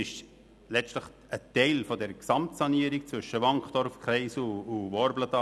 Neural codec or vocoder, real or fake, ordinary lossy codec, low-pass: none; real; none; none